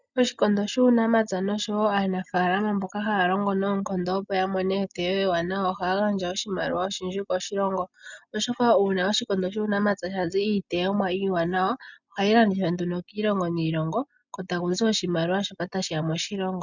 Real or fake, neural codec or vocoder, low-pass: real; none; 7.2 kHz